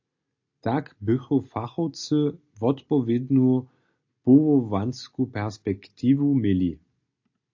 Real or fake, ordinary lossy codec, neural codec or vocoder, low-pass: real; AAC, 48 kbps; none; 7.2 kHz